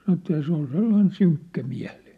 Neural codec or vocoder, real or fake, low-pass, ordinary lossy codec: none; real; 14.4 kHz; none